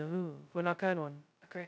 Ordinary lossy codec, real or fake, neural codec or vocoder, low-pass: none; fake; codec, 16 kHz, 0.2 kbps, FocalCodec; none